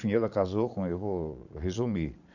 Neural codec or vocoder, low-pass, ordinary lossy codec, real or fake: vocoder, 22.05 kHz, 80 mel bands, Vocos; 7.2 kHz; none; fake